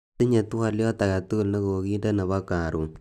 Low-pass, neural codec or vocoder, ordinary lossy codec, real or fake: 14.4 kHz; none; none; real